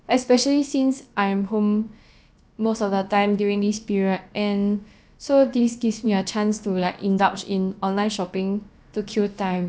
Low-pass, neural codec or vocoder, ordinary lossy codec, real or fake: none; codec, 16 kHz, about 1 kbps, DyCAST, with the encoder's durations; none; fake